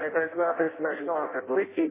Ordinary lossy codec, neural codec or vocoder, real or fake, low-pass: MP3, 16 kbps; codec, 16 kHz in and 24 kHz out, 0.6 kbps, FireRedTTS-2 codec; fake; 3.6 kHz